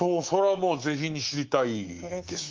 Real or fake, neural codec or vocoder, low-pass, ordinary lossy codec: fake; codec, 24 kHz, 3.1 kbps, DualCodec; 7.2 kHz; Opus, 32 kbps